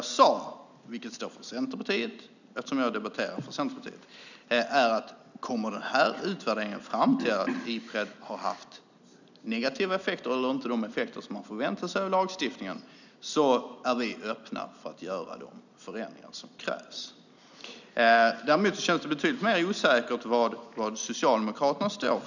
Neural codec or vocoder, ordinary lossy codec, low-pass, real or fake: none; none; 7.2 kHz; real